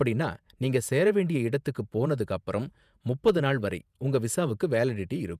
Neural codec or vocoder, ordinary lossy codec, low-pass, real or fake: vocoder, 48 kHz, 128 mel bands, Vocos; none; 14.4 kHz; fake